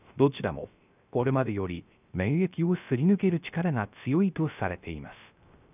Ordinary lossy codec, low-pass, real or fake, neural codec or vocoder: none; 3.6 kHz; fake; codec, 16 kHz, 0.3 kbps, FocalCodec